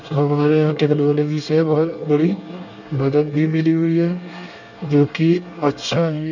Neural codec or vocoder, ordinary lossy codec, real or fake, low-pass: codec, 24 kHz, 1 kbps, SNAC; none; fake; 7.2 kHz